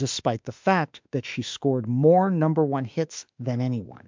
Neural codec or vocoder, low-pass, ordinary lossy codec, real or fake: autoencoder, 48 kHz, 32 numbers a frame, DAC-VAE, trained on Japanese speech; 7.2 kHz; MP3, 64 kbps; fake